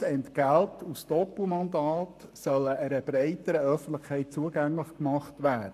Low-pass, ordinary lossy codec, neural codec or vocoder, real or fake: 14.4 kHz; none; codec, 44.1 kHz, 7.8 kbps, Pupu-Codec; fake